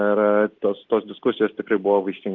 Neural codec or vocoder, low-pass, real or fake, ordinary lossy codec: none; 7.2 kHz; real; Opus, 16 kbps